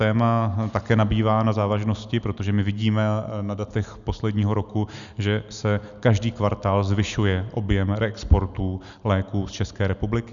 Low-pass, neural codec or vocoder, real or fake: 7.2 kHz; none; real